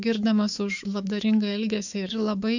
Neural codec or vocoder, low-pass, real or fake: autoencoder, 48 kHz, 128 numbers a frame, DAC-VAE, trained on Japanese speech; 7.2 kHz; fake